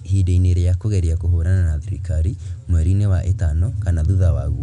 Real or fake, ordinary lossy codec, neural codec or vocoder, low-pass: real; none; none; 10.8 kHz